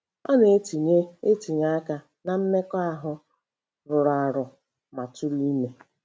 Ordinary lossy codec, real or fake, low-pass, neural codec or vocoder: none; real; none; none